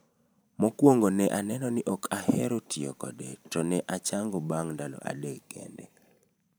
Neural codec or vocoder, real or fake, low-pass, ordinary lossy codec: vocoder, 44.1 kHz, 128 mel bands every 512 samples, BigVGAN v2; fake; none; none